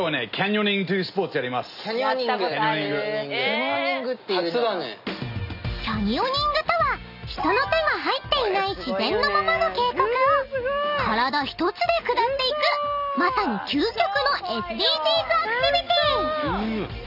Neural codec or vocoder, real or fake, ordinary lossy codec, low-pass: none; real; none; 5.4 kHz